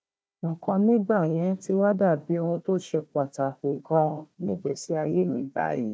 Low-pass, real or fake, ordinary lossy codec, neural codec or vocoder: none; fake; none; codec, 16 kHz, 1 kbps, FunCodec, trained on Chinese and English, 50 frames a second